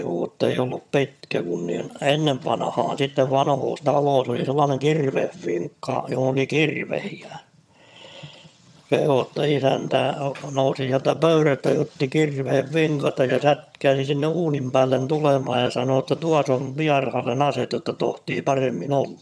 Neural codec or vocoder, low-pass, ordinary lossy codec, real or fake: vocoder, 22.05 kHz, 80 mel bands, HiFi-GAN; none; none; fake